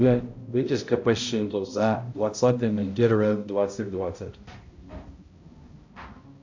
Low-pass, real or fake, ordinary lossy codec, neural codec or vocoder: 7.2 kHz; fake; MP3, 48 kbps; codec, 16 kHz, 0.5 kbps, X-Codec, HuBERT features, trained on balanced general audio